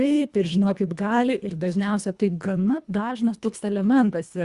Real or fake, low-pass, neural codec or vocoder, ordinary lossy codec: fake; 10.8 kHz; codec, 24 kHz, 1.5 kbps, HILCodec; Opus, 64 kbps